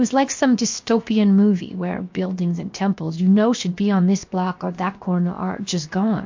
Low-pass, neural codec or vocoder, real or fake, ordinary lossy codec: 7.2 kHz; codec, 16 kHz, 0.7 kbps, FocalCodec; fake; MP3, 48 kbps